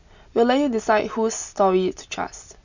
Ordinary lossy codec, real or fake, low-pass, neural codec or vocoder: none; real; 7.2 kHz; none